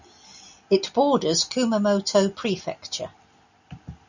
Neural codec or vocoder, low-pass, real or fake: none; 7.2 kHz; real